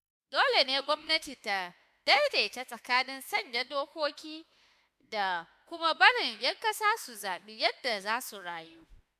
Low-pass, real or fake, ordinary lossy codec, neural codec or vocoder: 14.4 kHz; fake; AAC, 96 kbps; autoencoder, 48 kHz, 32 numbers a frame, DAC-VAE, trained on Japanese speech